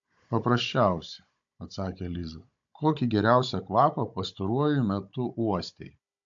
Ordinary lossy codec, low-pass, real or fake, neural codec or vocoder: AAC, 64 kbps; 7.2 kHz; fake; codec, 16 kHz, 16 kbps, FunCodec, trained on Chinese and English, 50 frames a second